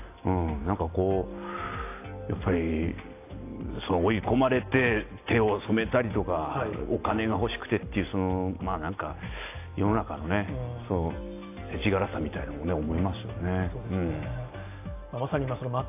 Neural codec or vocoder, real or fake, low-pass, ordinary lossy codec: none; real; 3.6 kHz; AAC, 24 kbps